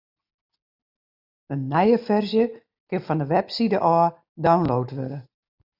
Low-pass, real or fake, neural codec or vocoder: 5.4 kHz; real; none